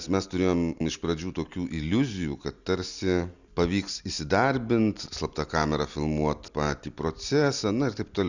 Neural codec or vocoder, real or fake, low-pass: none; real; 7.2 kHz